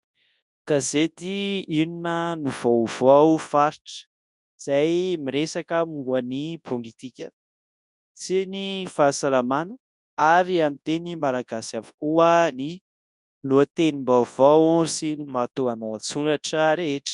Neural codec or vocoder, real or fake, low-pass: codec, 24 kHz, 0.9 kbps, WavTokenizer, large speech release; fake; 10.8 kHz